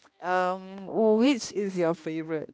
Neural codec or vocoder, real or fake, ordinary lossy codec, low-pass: codec, 16 kHz, 1 kbps, X-Codec, HuBERT features, trained on balanced general audio; fake; none; none